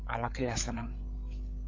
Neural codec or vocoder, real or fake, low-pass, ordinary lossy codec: codec, 16 kHz, 8 kbps, FreqCodec, larger model; fake; 7.2 kHz; AAC, 32 kbps